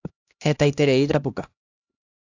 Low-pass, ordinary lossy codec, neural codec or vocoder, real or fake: 7.2 kHz; AAC, 48 kbps; codec, 16 kHz, 1 kbps, X-Codec, HuBERT features, trained on LibriSpeech; fake